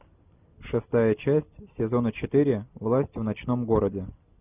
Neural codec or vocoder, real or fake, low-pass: none; real; 3.6 kHz